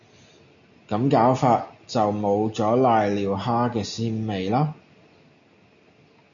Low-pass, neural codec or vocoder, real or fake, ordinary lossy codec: 7.2 kHz; none; real; MP3, 96 kbps